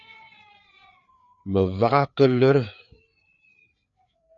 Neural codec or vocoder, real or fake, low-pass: codec, 16 kHz, 4 kbps, FreqCodec, larger model; fake; 7.2 kHz